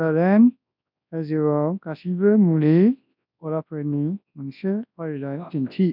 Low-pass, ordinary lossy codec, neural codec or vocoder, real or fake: 5.4 kHz; none; codec, 24 kHz, 0.9 kbps, WavTokenizer, large speech release; fake